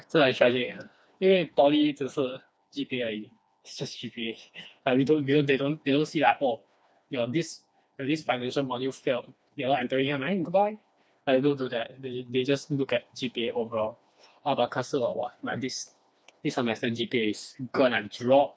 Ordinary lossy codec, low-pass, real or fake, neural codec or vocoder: none; none; fake; codec, 16 kHz, 2 kbps, FreqCodec, smaller model